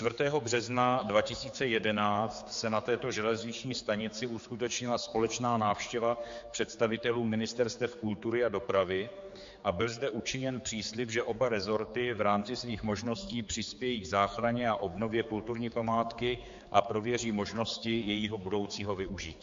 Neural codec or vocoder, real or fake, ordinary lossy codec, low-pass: codec, 16 kHz, 4 kbps, X-Codec, HuBERT features, trained on general audio; fake; MP3, 48 kbps; 7.2 kHz